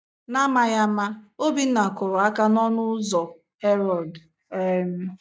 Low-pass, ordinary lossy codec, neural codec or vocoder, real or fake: none; none; none; real